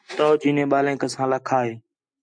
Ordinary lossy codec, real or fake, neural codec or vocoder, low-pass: MP3, 64 kbps; real; none; 9.9 kHz